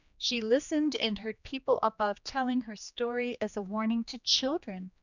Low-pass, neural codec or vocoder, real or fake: 7.2 kHz; codec, 16 kHz, 2 kbps, X-Codec, HuBERT features, trained on general audio; fake